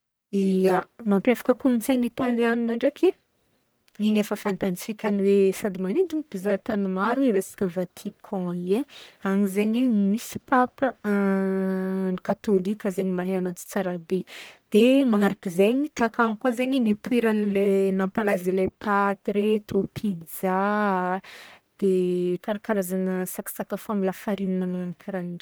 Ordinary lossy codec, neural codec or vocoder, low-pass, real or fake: none; codec, 44.1 kHz, 1.7 kbps, Pupu-Codec; none; fake